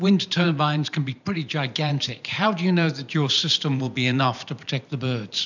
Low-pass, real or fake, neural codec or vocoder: 7.2 kHz; fake; vocoder, 44.1 kHz, 128 mel bands every 512 samples, BigVGAN v2